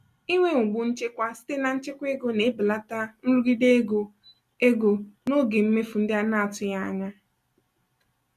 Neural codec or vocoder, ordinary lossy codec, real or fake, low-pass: none; none; real; 14.4 kHz